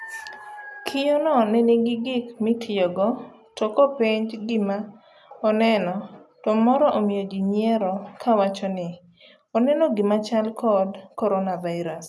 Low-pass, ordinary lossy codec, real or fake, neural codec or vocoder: none; none; real; none